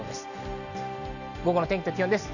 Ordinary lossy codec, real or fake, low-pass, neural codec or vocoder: none; real; 7.2 kHz; none